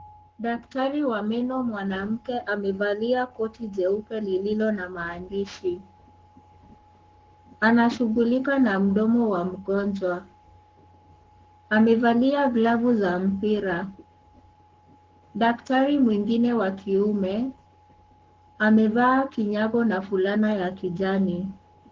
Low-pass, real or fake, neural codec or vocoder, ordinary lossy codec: 7.2 kHz; fake; codec, 44.1 kHz, 7.8 kbps, Pupu-Codec; Opus, 16 kbps